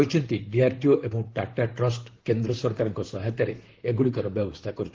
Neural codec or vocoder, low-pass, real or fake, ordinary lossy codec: vocoder, 44.1 kHz, 128 mel bands, Pupu-Vocoder; 7.2 kHz; fake; Opus, 16 kbps